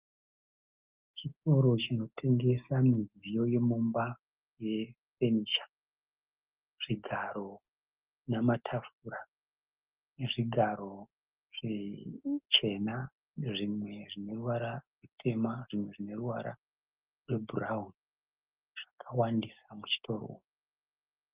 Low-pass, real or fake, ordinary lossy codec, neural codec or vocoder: 3.6 kHz; real; Opus, 24 kbps; none